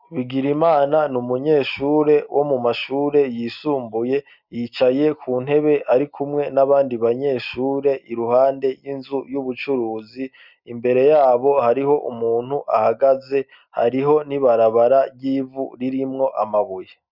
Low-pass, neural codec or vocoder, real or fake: 5.4 kHz; none; real